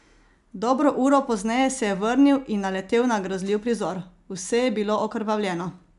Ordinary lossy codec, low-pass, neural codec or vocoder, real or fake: none; 10.8 kHz; none; real